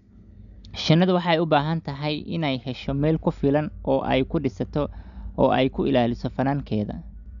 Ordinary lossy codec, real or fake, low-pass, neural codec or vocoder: none; real; 7.2 kHz; none